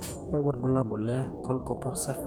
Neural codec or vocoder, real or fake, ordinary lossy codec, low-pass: codec, 44.1 kHz, 2.6 kbps, DAC; fake; none; none